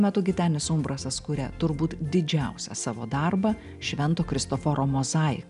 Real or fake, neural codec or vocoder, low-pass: real; none; 10.8 kHz